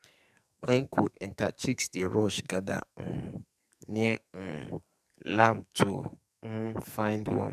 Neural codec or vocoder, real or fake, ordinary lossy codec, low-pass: codec, 44.1 kHz, 2.6 kbps, SNAC; fake; none; 14.4 kHz